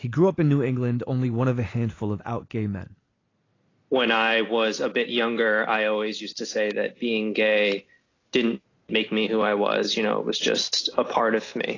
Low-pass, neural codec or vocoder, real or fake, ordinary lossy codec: 7.2 kHz; none; real; AAC, 32 kbps